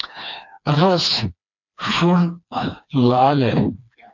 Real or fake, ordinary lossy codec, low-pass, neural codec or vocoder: fake; MP3, 48 kbps; 7.2 kHz; codec, 16 kHz, 2 kbps, FreqCodec, smaller model